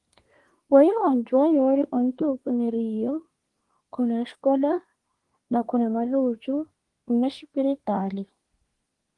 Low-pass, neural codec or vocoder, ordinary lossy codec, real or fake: 10.8 kHz; codec, 24 kHz, 1 kbps, SNAC; Opus, 24 kbps; fake